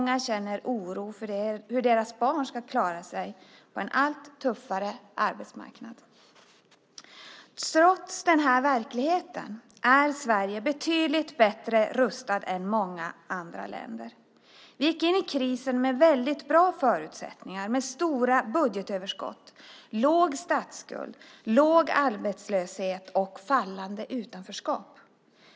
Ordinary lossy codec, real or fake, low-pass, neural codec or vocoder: none; real; none; none